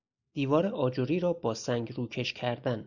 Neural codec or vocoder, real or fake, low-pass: none; real; 7.2 kHz